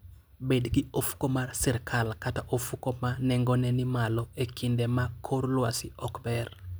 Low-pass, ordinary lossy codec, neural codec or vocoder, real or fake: none; none; none; real